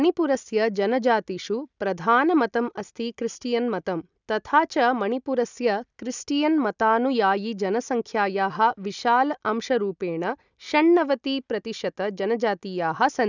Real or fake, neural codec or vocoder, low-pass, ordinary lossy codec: real; none; 7.2 kHz; none